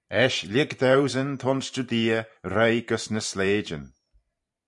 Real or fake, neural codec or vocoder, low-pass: fake; vocoder, 44.1 kHz, 128 mel bands every 512 samples, BigVGAN v2; 10.8 kHz